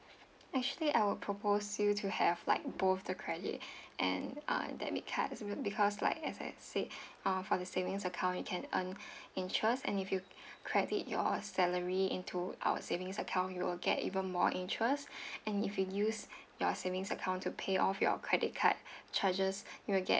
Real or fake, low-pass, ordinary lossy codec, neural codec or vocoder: real; none; none; none